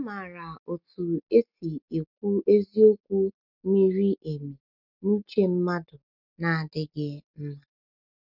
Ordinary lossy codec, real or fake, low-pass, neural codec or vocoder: none; real; 5.4 kHz; none